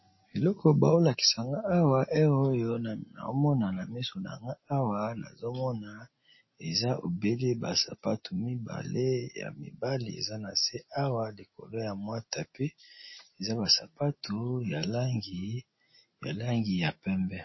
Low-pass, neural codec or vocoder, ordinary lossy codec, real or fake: 7.2 kHz; none; MP3, 24 kbps; real